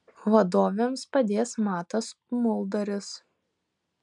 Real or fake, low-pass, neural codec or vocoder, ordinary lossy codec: real; 10.8 kHz; none; MP3, 96 kbps